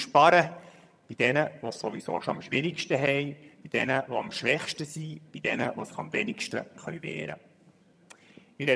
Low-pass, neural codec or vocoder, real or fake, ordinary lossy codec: none; vocoder, 22.05 kHz, 80 mel bands, HiFi-GAN; fake; none